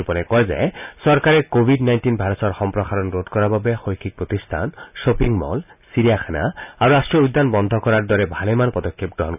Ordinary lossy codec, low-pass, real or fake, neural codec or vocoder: none; 3.6 kHz; real; none